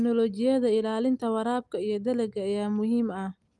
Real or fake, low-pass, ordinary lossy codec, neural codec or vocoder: real; 10.8 kHz; Opus, 32 kbps; none